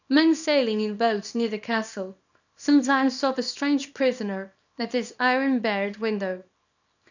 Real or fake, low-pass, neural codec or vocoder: fake; 7.2 kHz; codec, 24 kHz, 0.9 kbps, WavTokenizer, small release